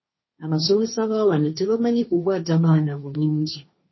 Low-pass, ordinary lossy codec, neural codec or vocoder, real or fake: 7.2 kHz; MP3, 24 kbps; codec, 16 kHz, 1.1 kbps, Voila-Tokenizer; fake